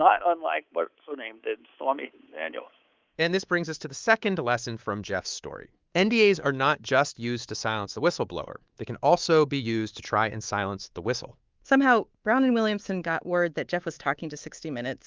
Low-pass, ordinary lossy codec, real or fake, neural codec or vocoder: 7.2 kHz; Opus, 32 kbps; fake; codec, 24 kHz, 3.1 kbps, DualCodec